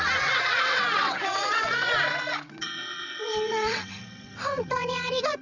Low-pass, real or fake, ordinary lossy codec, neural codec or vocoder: 7.2 kHz; fake; none; vocoder, 22.05 kHz, 80 mel bands, WaveNeXt